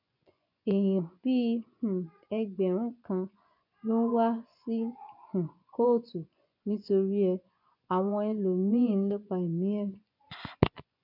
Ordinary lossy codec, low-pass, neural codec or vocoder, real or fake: none; 5.4 kHz; vocoder, 24 kHz, 100 mel bands, Vocos; fake